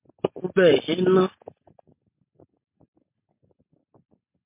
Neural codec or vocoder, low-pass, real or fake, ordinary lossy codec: none; 3.6 kHz; real; MP3, 32 kbps